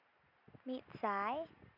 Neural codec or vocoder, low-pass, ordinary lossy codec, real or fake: none; 5.4 kHz; none; real